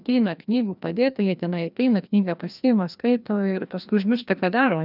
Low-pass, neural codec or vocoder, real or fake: 5.4 kHz; codec, 16 kHz, 1 kbps, FreqCodec, larger model; fake